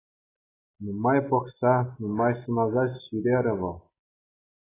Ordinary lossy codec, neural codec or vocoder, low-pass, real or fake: AAC, 16 kbps; none; 3.6 kHz; real